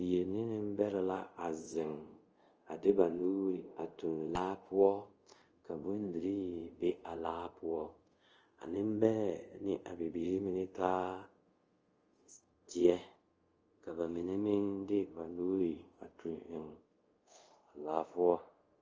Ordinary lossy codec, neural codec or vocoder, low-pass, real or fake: Opus, 24 kbps; codec, 24 kHz, 0.5 kbps, DualCodec; 7.2 kHz; fake